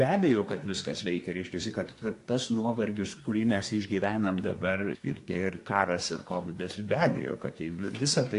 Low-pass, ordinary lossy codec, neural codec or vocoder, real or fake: 10.8 kHz; AAC, 48 kbps; codec, 24 kHz, 1 kbps, SNAC; fake